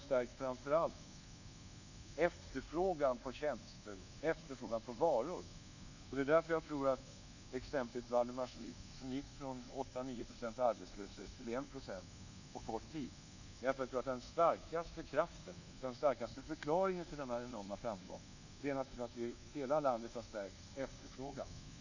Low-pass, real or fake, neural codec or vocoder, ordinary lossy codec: 7.2 kHz; fake; codec, 24 kHz, 1.2 kbps, DualCodec; none